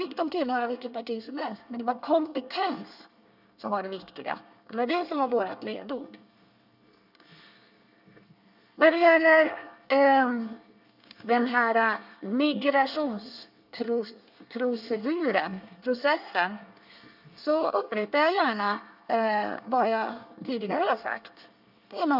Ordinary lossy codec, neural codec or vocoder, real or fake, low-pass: none; codec, 24 kHz, 1 kbps, SNAC; fake; 5.4 kHz